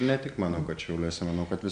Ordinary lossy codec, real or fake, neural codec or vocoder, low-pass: MP3, 96 kbps; real; none; 9.9 kHz